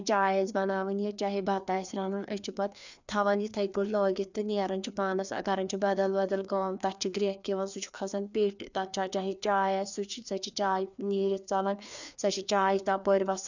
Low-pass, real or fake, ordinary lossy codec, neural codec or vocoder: 7.2 kHz; fake; none; codec, 16 kHz, 2 kbps, FreqCodec, larger model